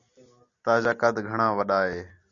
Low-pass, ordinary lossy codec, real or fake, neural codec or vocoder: 7.2 kHz; MP3, 96 kbps; real; none